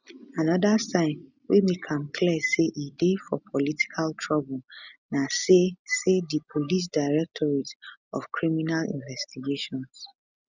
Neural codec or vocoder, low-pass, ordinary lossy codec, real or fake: none; 7.2 kHz; none; real